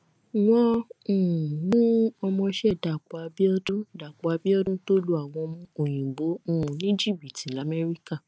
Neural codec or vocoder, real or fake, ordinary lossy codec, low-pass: none; real; none; none